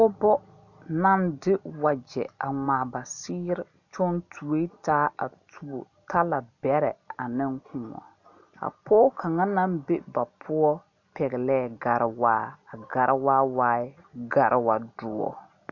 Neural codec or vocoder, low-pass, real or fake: none; 7.2 kHz; real